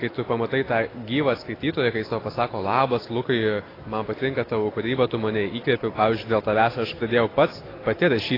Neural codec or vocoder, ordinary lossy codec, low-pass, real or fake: none; AAC, 24 kbps; 5.4 kHz; real